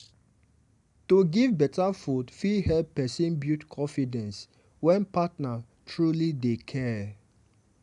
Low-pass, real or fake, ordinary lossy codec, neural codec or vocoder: 10.8 kHz; real; none; none